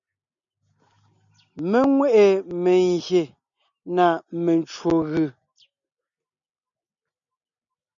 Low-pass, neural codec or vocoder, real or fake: 7.2 kHz; none; real